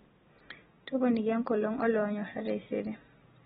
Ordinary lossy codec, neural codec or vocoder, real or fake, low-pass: AAC, 16 kbps; none; real; 19.8 kHz